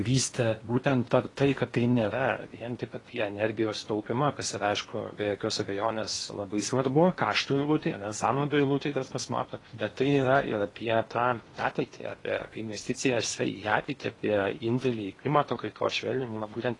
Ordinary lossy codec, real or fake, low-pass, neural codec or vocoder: AAC, 32 kbps; fake; 10.8 kHz; codec, 16 kHz in and 24 kHz out, 0.8 kbps, FocalCodec, streaming, 65536 codes